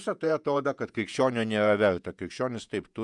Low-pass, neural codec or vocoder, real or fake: 10.8 kHz; vocoder, 48 kHz, 128 mel bands, Vocos; fake